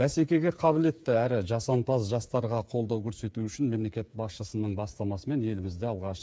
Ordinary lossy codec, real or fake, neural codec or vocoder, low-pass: none; fake; codec, 16 kHz, 8 kbps, FreqCodec, smaller model; none